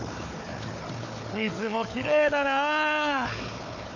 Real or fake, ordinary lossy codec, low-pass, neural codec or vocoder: fake; none; 7.2 kHz; codec, 16 kHz, 4 kbps, FunCodec, trained on Chinese and English, 50 frames a second